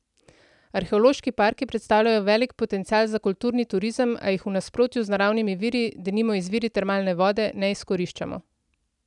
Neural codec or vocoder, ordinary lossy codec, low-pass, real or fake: none; none; 10.8 kHz; real